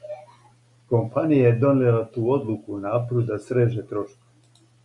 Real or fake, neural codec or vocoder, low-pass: real; none; 10.8 kHz